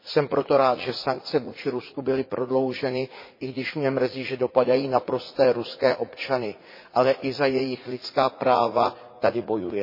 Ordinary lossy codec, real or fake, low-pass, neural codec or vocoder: MP3, 24 kbps; fake; 5.4 kHz; vocoder, 44.1 kHz, 80 mel bands, Vocos